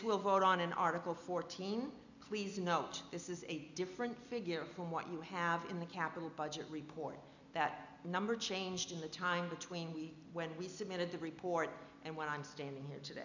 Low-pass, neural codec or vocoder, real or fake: 7.2 kHz; none; real